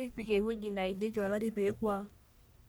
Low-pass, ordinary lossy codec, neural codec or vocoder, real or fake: none; none; codec, 44.1 kHz, 1.7 kbps, Pupu-Codec; fake